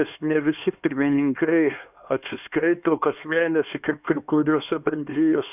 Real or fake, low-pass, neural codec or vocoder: fake; 3.6 kHz; codec, 16 kHz, 2 kbps, X-Codec, HuBERT features, trained on LibriSpeech